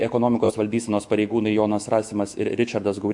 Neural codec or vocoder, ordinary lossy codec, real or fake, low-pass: autoencoder, 48 kHz, 128 numbers a frame, DAC-VAE, trained on Japanese speech; MP3, 64 kbps; fake; 10.8 kHz